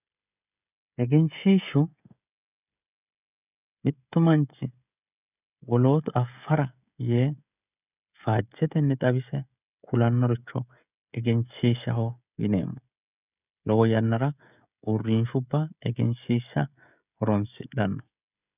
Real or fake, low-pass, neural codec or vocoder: fake; 3.6 kHz; codec, 16 kHz, 16 kbps, FreqCodec, smaller model